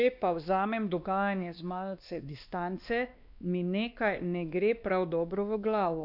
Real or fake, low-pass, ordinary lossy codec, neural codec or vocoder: fake; 5.4 kHz; none; codec, 16 kHz, 2 kbps, X-Codec, WavLM features, trained on Multilingual LibriSpeech